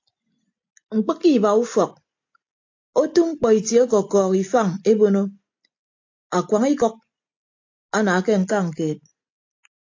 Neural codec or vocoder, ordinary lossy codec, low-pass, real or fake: none; AAC, 48 kbps; 7.2 kHz; real